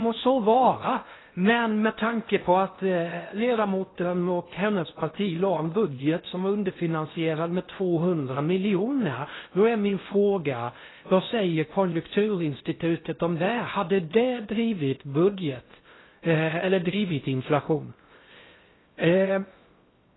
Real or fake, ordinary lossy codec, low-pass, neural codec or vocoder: fake; AAC, 16 kbps; 7.2 kHz; codec, 16 kHz in and 24 kHz out, 0.6 kbps, FocalCodec, streaming, 4096 codes